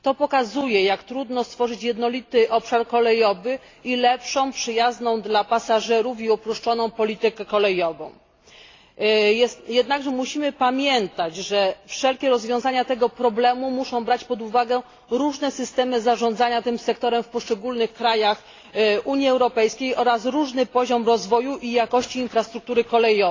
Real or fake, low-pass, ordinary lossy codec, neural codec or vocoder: real; 7.2 kHz; AAC, 32 kbps; none